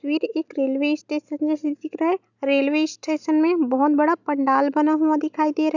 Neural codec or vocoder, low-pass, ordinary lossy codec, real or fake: none; 7.2 kHz; none; real